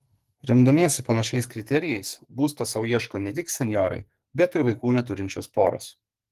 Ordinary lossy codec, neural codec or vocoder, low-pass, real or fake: Opus, 24 kbps; codec, 44.1 kHz, 2.6 kbps, SNAC; 14.4 kHz; fake